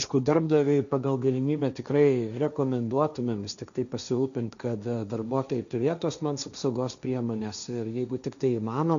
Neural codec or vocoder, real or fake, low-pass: codec, 16 kHz, 1.1 kbps, Voila-Tokenizer; fake; 7.2 kHz